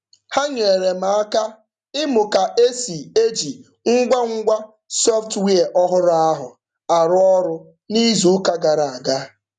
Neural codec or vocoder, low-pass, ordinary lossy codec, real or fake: none; 9.9 kHz; none; real